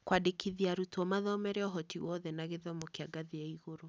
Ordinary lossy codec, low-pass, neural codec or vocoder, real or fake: none; none; none; real